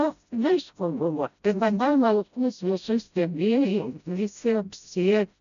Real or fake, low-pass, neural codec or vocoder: fake; 7.2 kHz; codec, 16 kHz, 0.5 kbps, FreqCodec, smaller model